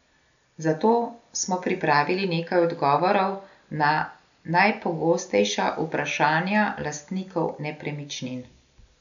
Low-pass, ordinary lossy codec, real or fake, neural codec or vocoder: 7.2 kHz; none; real; none